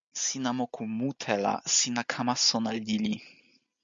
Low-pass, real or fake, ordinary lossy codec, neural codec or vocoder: 7.2 kHz; real; MP3, 64 kbps; none